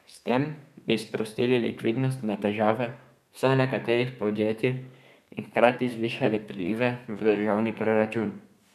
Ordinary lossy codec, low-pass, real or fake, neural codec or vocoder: none; 14.4 kHz; fake; codec, 32 kHz, 1.9 kbps, SNAC